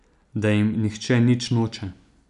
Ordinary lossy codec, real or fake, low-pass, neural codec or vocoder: none; real; 10.8 kHz; none